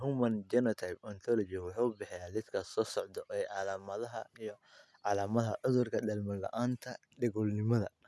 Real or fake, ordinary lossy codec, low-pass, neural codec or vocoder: real; none; none; none